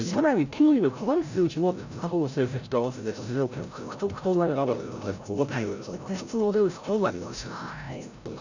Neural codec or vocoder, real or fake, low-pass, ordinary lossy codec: codec, 16 kHz, 0.5 kbps, FreqCodec, larger model; fake; 7.2 kHz; none